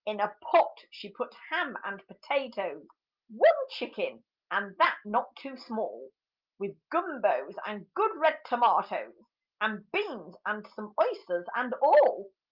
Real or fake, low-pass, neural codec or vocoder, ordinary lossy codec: real; 5.4 kHz; none; Opus, 32 kbps